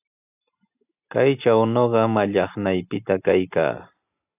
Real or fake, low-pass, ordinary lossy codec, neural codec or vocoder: real; 3.6 kHz; AAC, 24 kbps; none